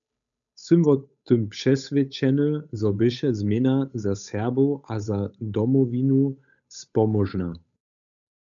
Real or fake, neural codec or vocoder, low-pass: fake; codec, 16 kHz, 8 kbps, FunCodec, trained on Chinese and English, 25 frames a second; 7.2 kHz